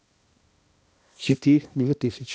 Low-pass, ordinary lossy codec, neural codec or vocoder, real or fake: none; none; codec, 16 kHz, 1 kbps, X-Codec, HuBERT features, trained on balanced general audio; fake